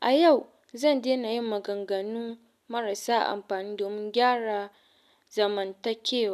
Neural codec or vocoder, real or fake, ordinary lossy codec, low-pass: none; real; none; 14.4 kHz